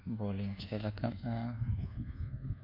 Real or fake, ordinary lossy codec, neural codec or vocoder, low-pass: fake; none; codec, 24 kHz, 1.2 kbps, DualCodec; 5.4 kHz